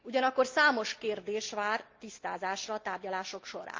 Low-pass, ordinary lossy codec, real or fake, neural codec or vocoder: 7.2 kHz; Opus, 24 kbps; real; none